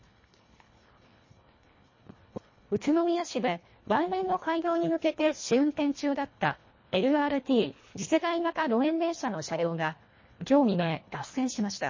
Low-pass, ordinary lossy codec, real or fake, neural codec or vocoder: 7.2 kHz; MP3, 32 kbps; fake; codec, 24 kHz, 1.5 kbps, HILCodec